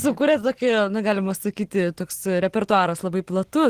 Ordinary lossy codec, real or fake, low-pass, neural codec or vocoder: Opus, 16 kbps; real; 14.4 kHz; none